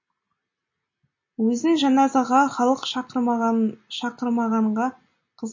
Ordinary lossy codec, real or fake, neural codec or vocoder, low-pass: MP3, 32 kbps; real; none; 7.2 kHz